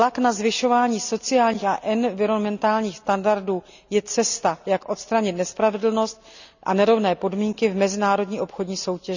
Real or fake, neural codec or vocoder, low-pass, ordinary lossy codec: real; none; 7.2 kHz; none